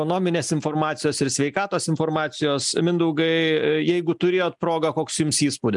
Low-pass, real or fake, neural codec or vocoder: 10.8 kHz; real; none